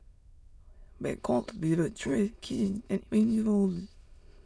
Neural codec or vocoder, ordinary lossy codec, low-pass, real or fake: autoencoder, 22.05 kHz, a latent of 192 numbers a frame, VITS, trained on many speakers; none; none; fake